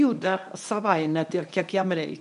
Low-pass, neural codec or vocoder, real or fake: 10.8 kHz; codec, 24 kHz, 0.9 kbps, WavTokenizer, medium speech release version 1; fake